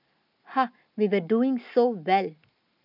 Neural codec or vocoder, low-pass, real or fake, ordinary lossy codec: none; 5.4 kHz; real; none